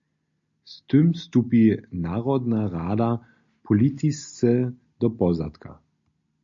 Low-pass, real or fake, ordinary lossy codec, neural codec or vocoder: 7.2 kHz; real; MP3, 48 kbps; none